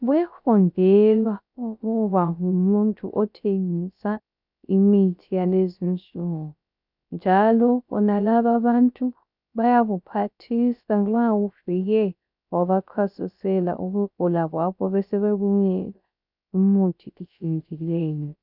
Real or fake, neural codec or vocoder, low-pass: fake; codec, 16 kHz, 0.3 kbps, FocalCodec; 5.4 kHz